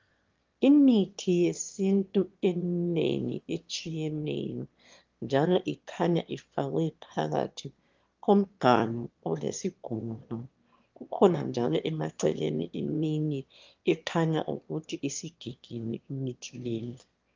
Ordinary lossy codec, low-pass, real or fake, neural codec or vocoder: Opus, 32 kbps; 7.2 kHz; fake; autoencoder, 22.05 kHz, a latent of 192 numbers a frame, VITS, trained on one speaker